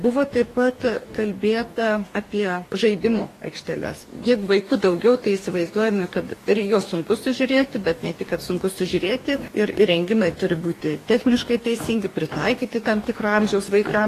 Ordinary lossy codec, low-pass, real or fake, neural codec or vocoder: AAC, 48 kbps; 14.4 kHz; fake; codec, 44.1 kHz, 2.6 kbps, DAC